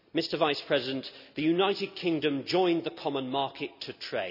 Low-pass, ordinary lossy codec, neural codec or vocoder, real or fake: 5.4 kHz; none; none; real